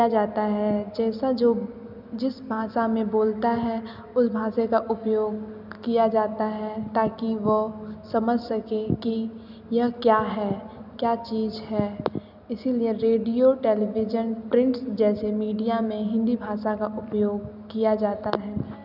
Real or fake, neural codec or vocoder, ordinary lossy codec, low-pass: real; none; none; 5.4 kHz